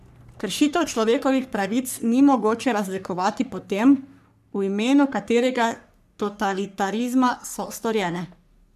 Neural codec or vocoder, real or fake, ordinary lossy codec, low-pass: codec, 44.1 kHz, 3.4 kbps, Pupu-Codec; fake; none; 14.4 kHz